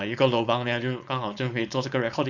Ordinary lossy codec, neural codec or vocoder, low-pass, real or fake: none; codec, 16 kHz, 4.8 kbps, FACodec; 7.2 kHz; fake